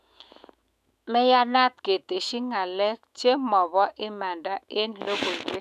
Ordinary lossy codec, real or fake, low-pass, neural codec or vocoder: none; fake; 14.4 kHz; autoencoder, 48 kHz, 128 numbers a frame, DAC-VAE, trained on Japanese speech